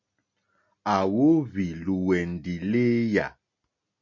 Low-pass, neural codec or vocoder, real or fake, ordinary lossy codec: 7.2 kHz; none; real; MP3, 48 kbps